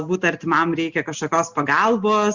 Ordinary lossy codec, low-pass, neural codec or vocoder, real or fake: Opus, 64 kbps; 7.2 kHz; none; real